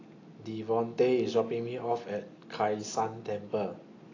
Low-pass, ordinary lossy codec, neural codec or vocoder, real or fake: 7.2 kHz; AAC, 32 kbps; none; real